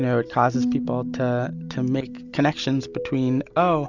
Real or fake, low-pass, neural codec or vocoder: real; 7.2 kHz; none